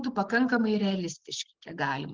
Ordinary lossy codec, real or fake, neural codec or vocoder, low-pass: Opus, 16 kbps; real; none; 7.2 kHz